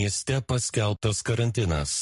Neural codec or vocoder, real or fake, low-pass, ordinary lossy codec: codec, 44.1 kHz, 7.8 kbps, Pupu-Codec; fake; 14.4 kHz; MP3, 48 kbps